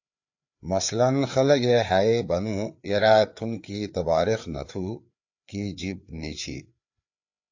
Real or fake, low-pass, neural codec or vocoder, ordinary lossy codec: fake; 7.2 kHz; codec, 16 kHz, 4 kbps, FreqCodec, larger model; AAC, 48 kbps